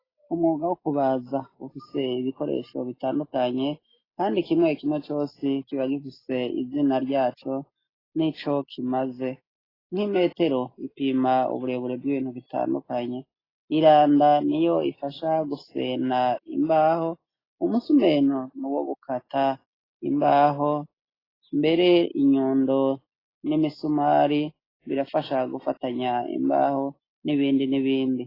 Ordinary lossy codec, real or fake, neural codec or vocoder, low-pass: AAC, 24 kbps; real; none; 5.4 kHz